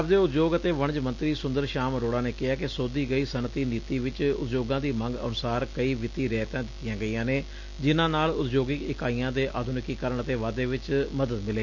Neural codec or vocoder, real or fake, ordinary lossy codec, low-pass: none; real; none; 7.2 kHz